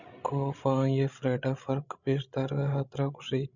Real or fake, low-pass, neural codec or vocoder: fake; 7.2 kHz; codec, 16 kHz, 16 kbps, FreqCodec, larger model